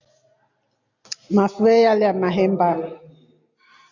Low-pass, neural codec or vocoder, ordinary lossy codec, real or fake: 7.2 kHz; none; AAC, 48 kbps; real